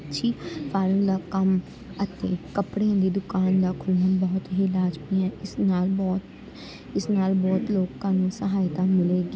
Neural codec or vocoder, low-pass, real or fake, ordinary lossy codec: none; none; real; none